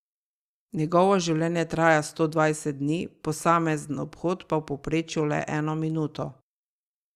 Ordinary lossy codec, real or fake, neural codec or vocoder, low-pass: Opus, 64 kbps; real; none; 14.4 kHz